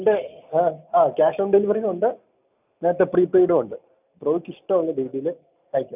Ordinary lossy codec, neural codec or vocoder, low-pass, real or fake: none; none; 3.6 kHz; real